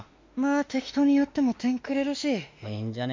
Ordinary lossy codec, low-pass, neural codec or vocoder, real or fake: MP3, 64 kbps; 7.2 kHz; autoencoder, 48 kHz, 32 numbers a frame, DAC-VAE, trained on Japanese speech; fake